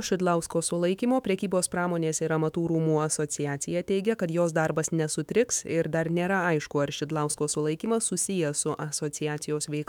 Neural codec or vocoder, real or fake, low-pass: autoencoder, 48 kHz, 128 numbers a frame, DAC-VAE, trained on Japanese speech; fake; 19.8 kHz